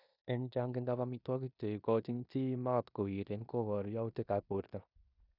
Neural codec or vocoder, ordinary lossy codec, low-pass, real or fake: codec, 16 kHz in and 24 kHz out, 0.9 kbps, LongCat-Audio-Codec, four codebook decoder; none; 5.4 kHz; fake